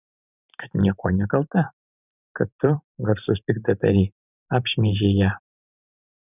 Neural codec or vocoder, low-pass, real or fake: vocoder, 44.1 kHz, 128 mel bands every 512 samples, BigVGAN v2; 3.6 kHz; fake